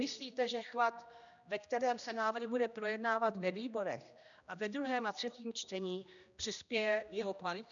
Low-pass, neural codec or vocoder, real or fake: 7.2 kHz; codec, 16 kHz, 1 kbps, X-Codec, HuBERT features, trained on general audio; fake